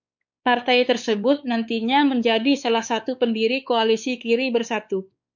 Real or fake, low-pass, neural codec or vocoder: fake; 7.2 kHz; codec, 16 kHz, 4 kbps, X-Codec, WavLM features, trained on Multilingual LibriSpeech